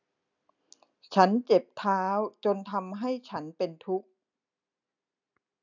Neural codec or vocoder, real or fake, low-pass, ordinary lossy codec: none; real; 7.2 kHz; none